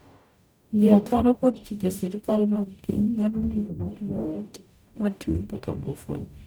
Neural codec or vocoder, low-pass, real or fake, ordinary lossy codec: codec, 44.1 kHz, 0.9 kbps, DAC; none; fake; none